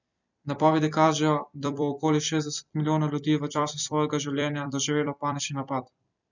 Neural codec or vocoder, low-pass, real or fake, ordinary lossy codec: vocoder, 44.1 kHz, 128 mel bands every 256 samples, BigVGAN v2; 7.2 kHz; fake; none